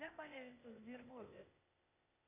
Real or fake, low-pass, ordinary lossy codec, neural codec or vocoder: fake; 3.6 kHz; MP3, 32 kbps; codec, 16 kHz, 0.8 kbps, ZipCodec